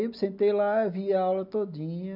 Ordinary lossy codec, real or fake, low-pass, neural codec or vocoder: none; real; 5.4 kHz; none